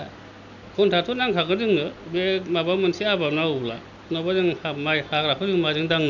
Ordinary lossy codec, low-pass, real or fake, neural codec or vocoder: none; 7.2 kHz; real; none